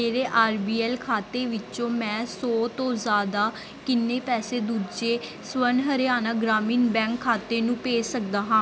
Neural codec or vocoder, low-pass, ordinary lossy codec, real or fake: none; none; none; real